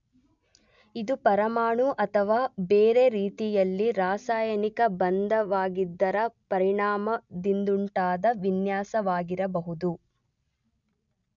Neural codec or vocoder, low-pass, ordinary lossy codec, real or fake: none; 7.2 kHz; none; real